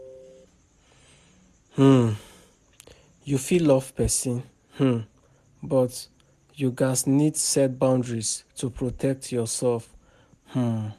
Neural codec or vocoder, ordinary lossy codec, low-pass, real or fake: none; MP3, 96 kbps; 14.4 kHz; real